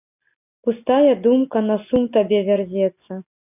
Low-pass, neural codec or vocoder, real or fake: 3.6 kHz; none; real